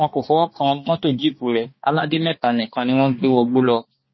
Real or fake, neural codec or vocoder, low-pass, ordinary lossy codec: fake; codec, 16 kHz, 2 kbps, X-Codec, HuBERT features, trained on balanced general audio; 7.2 kHz; MP3, 24 kbps